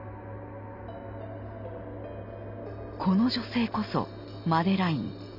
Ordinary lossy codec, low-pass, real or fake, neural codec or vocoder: none; 5.4 kHz; real; none